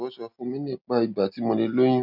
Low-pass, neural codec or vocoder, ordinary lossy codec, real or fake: 5.4 kHz; none; none; real